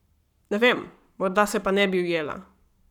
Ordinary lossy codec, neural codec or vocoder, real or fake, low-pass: none; codec, 44.1 kHz, 7.8 kbps, Pupu-Codec; fake; 19.8 kHz